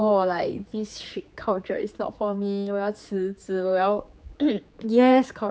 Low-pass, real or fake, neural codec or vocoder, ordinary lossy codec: none; fake; codec, 16 kHz, 4 kbps, X-Codec, HuBERT features, trained on general audio; none